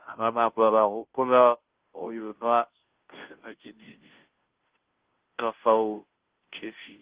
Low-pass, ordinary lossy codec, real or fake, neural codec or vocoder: 3.6 kHz; Opus, 16 kbps; fake; codec, 16 kHz, 0.5 kbps, FunCodec, trained on Chinese and English, 25 frames a second